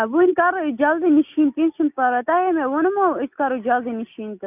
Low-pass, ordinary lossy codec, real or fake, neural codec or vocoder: 3.6 kHz; none; real; none